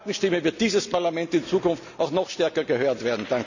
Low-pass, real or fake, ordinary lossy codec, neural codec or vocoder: 7.2 kHz; real; none; none